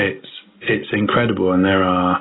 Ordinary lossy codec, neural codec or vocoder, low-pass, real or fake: AAC, 16 kbps; none; 7.2 kHz; real